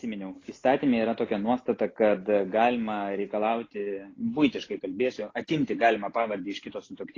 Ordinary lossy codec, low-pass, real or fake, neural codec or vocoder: AAC, 32 kbps; 7.2 kHz; real; none